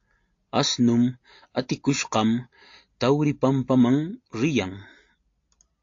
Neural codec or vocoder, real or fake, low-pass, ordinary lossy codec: none; real; 7.2 kHz; AAC, 48 kbps